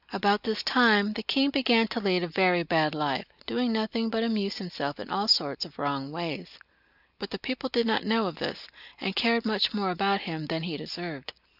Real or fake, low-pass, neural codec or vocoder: real; 5.4 kHz; none